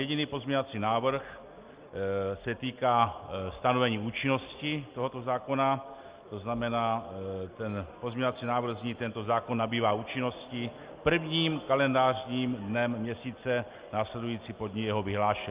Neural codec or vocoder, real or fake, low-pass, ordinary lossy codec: none; real; 3.6 kHz; Opus, 24 kbps